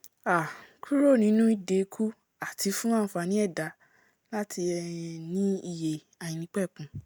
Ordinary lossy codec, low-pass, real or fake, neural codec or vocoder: none; none; real; none